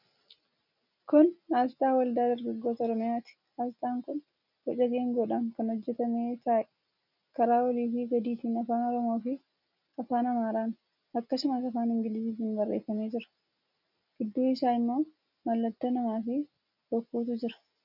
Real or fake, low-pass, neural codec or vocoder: real; 5.4 kHz; none